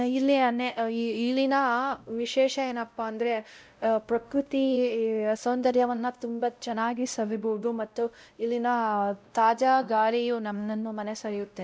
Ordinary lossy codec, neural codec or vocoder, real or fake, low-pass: none; codec, 16 kHz, 0.5 kbps, X-Codec, WavLM features, trained on Multilingual LibriSpeech; fake; none